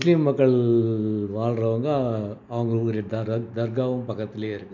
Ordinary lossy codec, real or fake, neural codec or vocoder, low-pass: none; real; none; 7.2 kHz